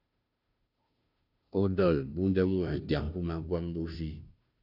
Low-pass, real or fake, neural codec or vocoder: 5.4 kHz; fake; codec, 16 kHz, 0.5 kbps, FunCodec, trained on Chinese and English, 25 frames a second